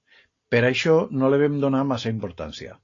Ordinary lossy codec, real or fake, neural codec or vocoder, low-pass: AAC, 32 kbps; real; none; 7.2 kHz